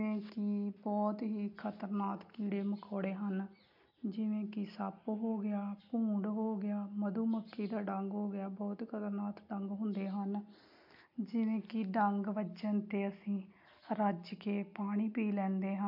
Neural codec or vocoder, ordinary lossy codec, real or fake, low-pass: none; MP3, 48 kbps; real; 5.4 kHz